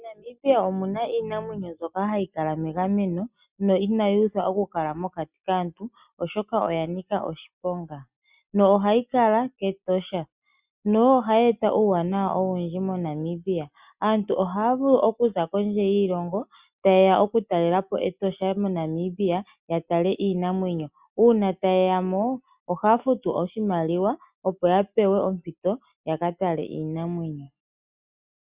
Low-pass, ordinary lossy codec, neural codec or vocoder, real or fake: 3.6 kHz; Opus, 64 kbps; none; real